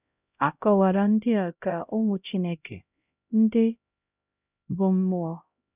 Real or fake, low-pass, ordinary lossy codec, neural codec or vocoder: fake; 3.6 kHz; none; codec, 16 kHz, 0.5 kbps, X-Codec, HuBERT features, trained on LibriSpeech